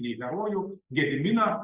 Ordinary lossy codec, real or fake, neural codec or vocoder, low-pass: Opus, 64 kbps; real; none; 3.6 kHz